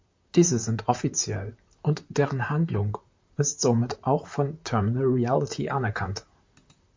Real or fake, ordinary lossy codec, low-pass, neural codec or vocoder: fake; MP3, 48 kbps; 7.2 kHz; vocoder, 44.1 kHz, 128 mel bands, Pupu-Vocoder